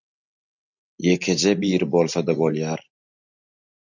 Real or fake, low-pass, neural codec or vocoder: real; 7.2 kHz; none